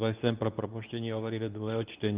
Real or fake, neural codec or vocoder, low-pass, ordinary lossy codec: fake; codec, 24 kHz, 0.9 kbps, WavTokenizer, medium speech release version 2; 3.6 kHz; Opus, 32 kbps